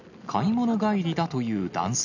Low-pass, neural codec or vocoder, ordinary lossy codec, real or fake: 7.2 kHz; none; none; real